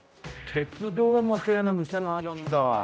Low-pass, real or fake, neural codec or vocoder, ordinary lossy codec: none; fake; codec, 16 kHz, 0.5 kbps, X-Codec, HuBERT features, trained on general audio; none